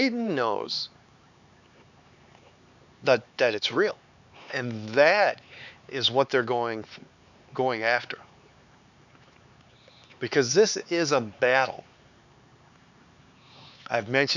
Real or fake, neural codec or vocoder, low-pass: fake; codec, 16 kHz, 4 kbps, X-Codec, HuBERT features, trained on LibriSpeech; 7.2 kHz